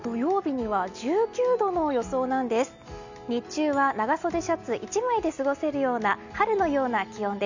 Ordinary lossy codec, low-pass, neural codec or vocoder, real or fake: none; 7.2 kHz; none; real